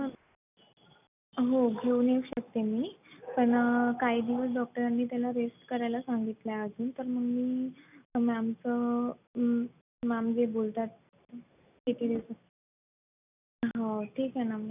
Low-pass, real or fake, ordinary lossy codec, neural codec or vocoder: 3.6 kHz; real; none; none